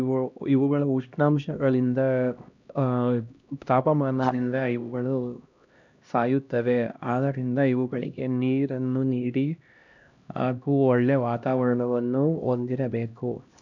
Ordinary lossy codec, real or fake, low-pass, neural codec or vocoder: none; fake; 7.2 kHz; codec, 16 kHz, 1 kbps, X-Codec, HuBERT features, trained on LibriSpeech